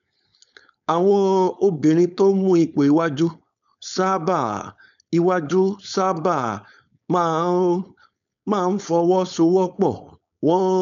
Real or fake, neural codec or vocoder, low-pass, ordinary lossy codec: fake; codec, 16 kHz, 4.8 kbps, FACodec; 7.2 kHz; MP3, 96 kbps